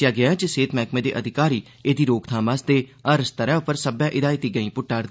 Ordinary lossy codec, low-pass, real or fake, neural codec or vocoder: none; none; real; none